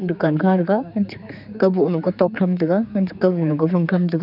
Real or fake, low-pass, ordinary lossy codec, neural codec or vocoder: fake; 5.4 kHz; none; codec, 16 kHz, 4 kbps, X-Codec, HuBERT features, trained on general audio